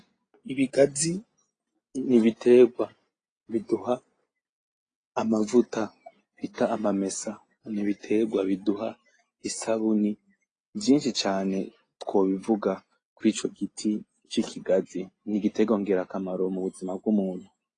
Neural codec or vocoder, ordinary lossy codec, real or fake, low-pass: none; AAC, 32 kbps; real; 9.9 kHz